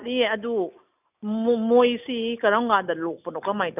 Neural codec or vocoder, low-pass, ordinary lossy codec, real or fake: none; 3.6 kHz; none; real